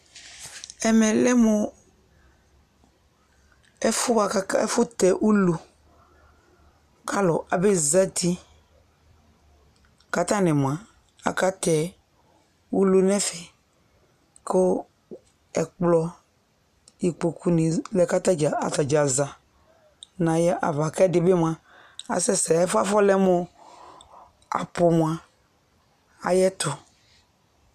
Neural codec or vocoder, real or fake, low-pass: none; real; 14.4 kHz